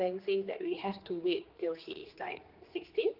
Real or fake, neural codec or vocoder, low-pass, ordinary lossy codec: fake; codec, 16 kHz, 2 kbps, X-Codec, HuBERT features, trained on general audio; 5.4 kHz; Opus, 24 kbps